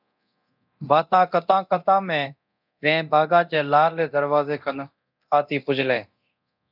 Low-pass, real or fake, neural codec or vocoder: 5.4 kHz; fake; codec, 24 kHz, 0.9 kbps, DualCodec